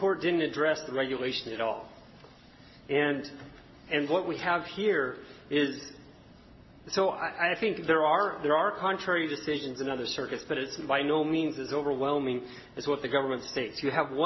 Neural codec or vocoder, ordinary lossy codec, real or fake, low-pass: none; MP3, 24 kbps; real; 7.2 kHz